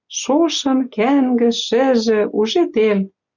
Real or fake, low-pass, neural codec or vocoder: real; 7.2 kHz; none